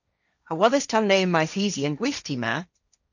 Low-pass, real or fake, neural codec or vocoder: 7.2 kHz; fake; codec, 16 kHz, 1.1 kbps, Voila-Tokenizer